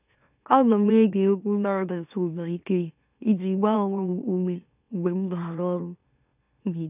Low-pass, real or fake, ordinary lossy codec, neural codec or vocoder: 3.6 kHz; fake; none; autoencoder, 44.1 kHz, a latent of 192 numbers a frame, MeloTTS